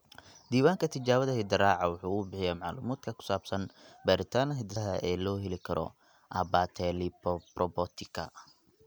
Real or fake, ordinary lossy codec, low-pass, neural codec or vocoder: real; none; none; none